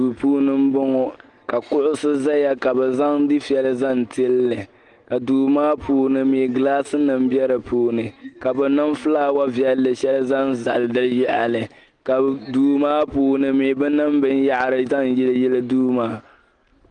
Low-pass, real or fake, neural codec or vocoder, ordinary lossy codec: 9.9 kHz; real; none; Opus, 24 kbps